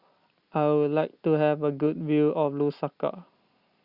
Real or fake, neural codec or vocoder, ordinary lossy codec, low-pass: real; none; Opus, 64 kbps; 5.4 kHz